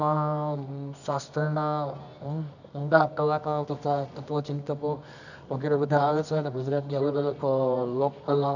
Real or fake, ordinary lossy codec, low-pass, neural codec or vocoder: fake; none; 7.2 kHz; codec, 24 kHz, 0.9 kbps, WavTokenizer, medium music audio release